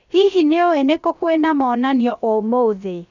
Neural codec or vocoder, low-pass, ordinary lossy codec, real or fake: codec, 16 kHz, about 1 kbps, DyCAST, with the encoder's durations; 7.2 kHz; none; fake